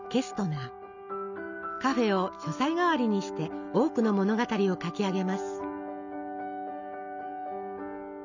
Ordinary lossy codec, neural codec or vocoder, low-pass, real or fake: none; none; 7.2 kHz; real